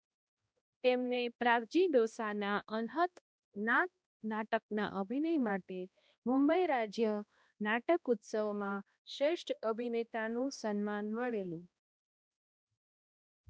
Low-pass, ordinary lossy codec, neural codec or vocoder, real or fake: none; none; codec, 16 kHz, 1 kbps, X-Codec, HuBERT features, trained on balanced general audio; fake